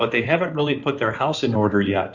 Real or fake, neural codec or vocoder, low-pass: fake; codec, 16 kHz in and 24 kHz out, 2.2 kbps, FireRedTTS-2 codec; 7.2 kHz